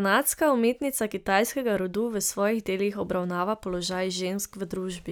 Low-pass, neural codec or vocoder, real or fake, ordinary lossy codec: none; none; real; none